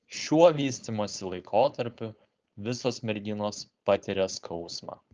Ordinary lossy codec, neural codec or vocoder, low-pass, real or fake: Opus, 24 kbps; codec, 16 kHz, 4.8 kbps, FACodec; 7.2 kHz; fake